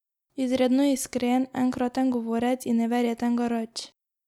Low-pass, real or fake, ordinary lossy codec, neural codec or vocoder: 19.8 kHz; real; none; none